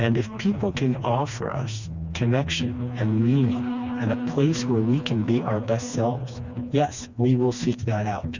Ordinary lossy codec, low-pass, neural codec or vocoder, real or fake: Opus, 64 kbps; 7.2 kHz; codec, 16 kHz, 2 kbps, FreqCodec, smaller model; fake